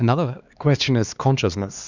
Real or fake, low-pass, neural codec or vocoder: fake; 7.2 kHz; codec, 16 kHz, 2 kbps, X-Codec, HuBERT features, trained on LibriSpeech